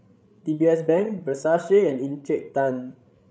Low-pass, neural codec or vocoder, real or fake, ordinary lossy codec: none; codec, 16 kHz, 16 kbps, FreqCodec, larger model; fake; none